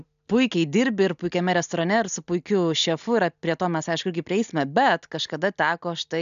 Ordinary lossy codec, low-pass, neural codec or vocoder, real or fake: AAC, 96 kbps; 7.2 kHz; none; real